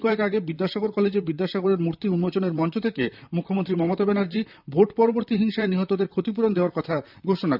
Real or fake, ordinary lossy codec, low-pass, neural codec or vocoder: fake; none; 5.4 kHz; vocoder, 44.1 kHz, 128 mel bands, Pupu-Vocoder